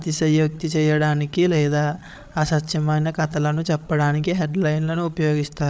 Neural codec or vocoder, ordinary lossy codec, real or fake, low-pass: codec, 16 kHz, 8 kbps, FreqCodec, larger model; none; fake; none